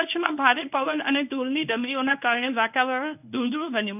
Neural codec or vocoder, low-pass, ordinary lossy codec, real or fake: codec, 24 kHz, 0.9 kbps, WavTokenizer, medium speech release version 1; 3.6 kHz; none; fake